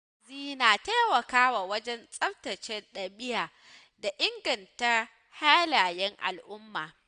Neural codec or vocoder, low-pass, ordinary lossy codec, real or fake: none; 9.9 kHz; none; real